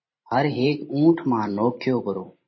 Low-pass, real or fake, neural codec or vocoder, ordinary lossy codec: 7.2 kHz; fake; vocoder, 44.1 kHz, 128 mel bands every 512 samples, BigVGAN v2; MP3, 24 kbps